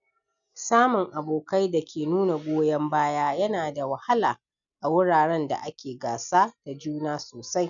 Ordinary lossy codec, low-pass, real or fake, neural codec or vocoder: none; 7.2 kHz; real; none